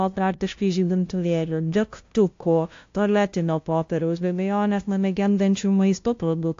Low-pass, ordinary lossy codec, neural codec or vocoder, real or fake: 7.2 kHz; AAC, 48 kbps; codec, 16 kHz, 0.5 kbps, FunCodec, trained on LibriTTS, 25 frames a second; fake